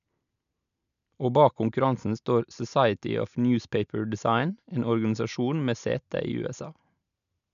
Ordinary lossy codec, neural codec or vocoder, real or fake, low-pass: none; none; real; 7.2 kHz